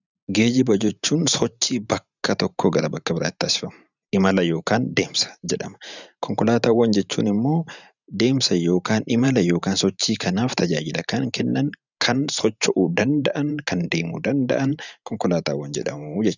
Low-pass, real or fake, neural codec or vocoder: 7.2 kHz; fake; vocoder, 44.1 kHz, 128 mel bands every 512 samples, BigVGAN v2